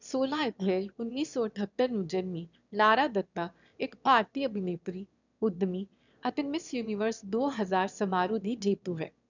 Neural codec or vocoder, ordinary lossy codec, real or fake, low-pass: autoencoder, 22.05 kHz, a latent of 192 numbers a frame, VITS, trained on one speaker; none; fake; 7.2 kHz